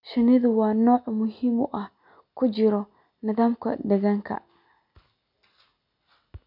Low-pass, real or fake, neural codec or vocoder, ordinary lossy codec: 5.4 kHz; real; none; none